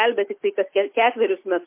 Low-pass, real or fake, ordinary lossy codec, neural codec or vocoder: 3.6 kHz; real; MP3, 24 kbps; none